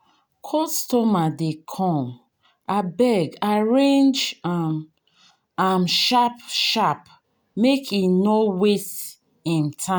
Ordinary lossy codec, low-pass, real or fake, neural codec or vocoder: none; none; real; none